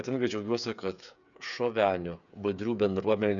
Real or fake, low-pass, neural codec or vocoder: fake; 7.2 kHz; codec, 16 kHz, 8 kbps, FreqCodec, smaller model